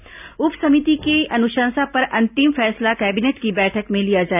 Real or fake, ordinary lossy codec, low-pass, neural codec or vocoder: real; none; 3.6 kHz; none